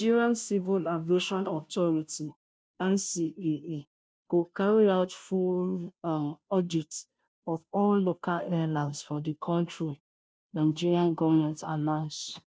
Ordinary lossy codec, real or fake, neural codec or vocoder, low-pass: none; fake; codec, 16 kHz, 0.5 kbps, FunCodec, trained on Chinese and English, 25 frames a second; none